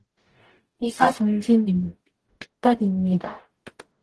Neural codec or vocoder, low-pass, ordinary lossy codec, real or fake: codec, 44.1 kHz, 0.9 kbps, DAC; 10.8 kHz; Opus, 16 kbps; fake